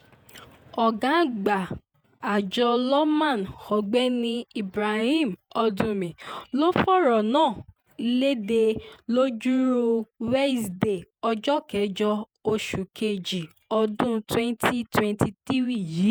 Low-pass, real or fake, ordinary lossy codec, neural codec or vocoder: none; fake; none; vocoder, 48 kHz, 128 mel bands, Vocos